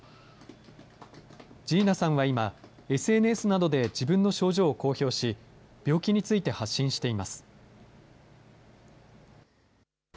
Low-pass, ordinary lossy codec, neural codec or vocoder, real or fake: none; none; none; real